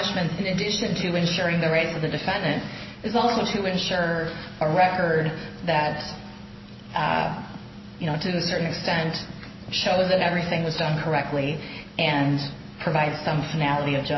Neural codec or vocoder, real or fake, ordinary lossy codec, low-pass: none; real; MP3, 24 kbps; 7.2 kHz